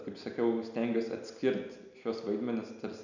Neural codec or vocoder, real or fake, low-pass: none; real; 7.2 kHz